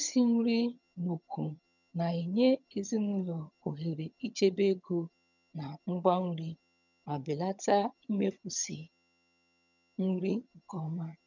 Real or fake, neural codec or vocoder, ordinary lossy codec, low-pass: fake; vocoder, 22.05 kHz, 80 mel bands, HiFi-GAN; none; 7.2 kHz